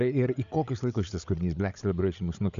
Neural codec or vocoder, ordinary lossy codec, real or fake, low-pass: codec, 16 kHz, 8 kbps, FreqCodec, larger model; AAC, 96 kbps; fake; 7.2 kHz